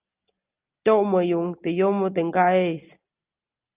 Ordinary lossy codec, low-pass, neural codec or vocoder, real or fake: Opus, 24 kbps; 3.6 kHz; none; real